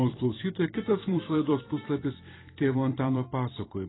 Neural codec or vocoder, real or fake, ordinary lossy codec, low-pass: vocoder, 44.1 kHz, 128 mel bands every 256 samples, BigVGAN v2; fake; AAC, 16 kbps; 7.2 kHz